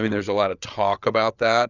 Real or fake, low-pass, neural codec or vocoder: fake; 7.2 kHz; codec, 16 kHz, 8 kbps, FreqCodec, larger model